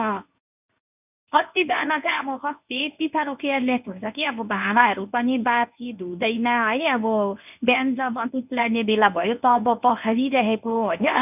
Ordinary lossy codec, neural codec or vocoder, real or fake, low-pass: none; codec, 24 kHz, 0.9 kbps, WavTokenizer, medium speech release version 1; fake; 3.6 kHz